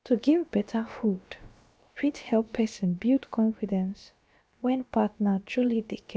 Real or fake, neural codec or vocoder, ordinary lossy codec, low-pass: fake; codec, 16 kHz, about 1 kbps, DyCAST, with the encoder's durations; none; none